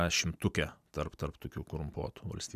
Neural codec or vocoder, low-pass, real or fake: vocoder, 44.1 kHz, 128 mel bands every 256 samples, BigVGAN v2; 14.4 kHz; fake